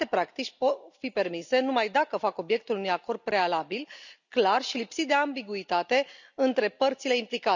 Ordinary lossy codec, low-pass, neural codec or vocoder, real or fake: none; 7.2 kHz; none; real